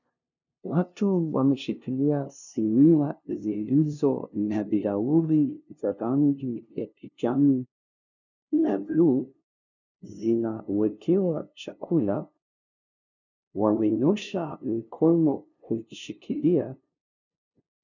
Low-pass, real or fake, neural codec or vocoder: 7.2 kHz; fake; codec, 16 kHz, 0.5 kbps, FunCodec, trained on LibriTTS, 25 frames a second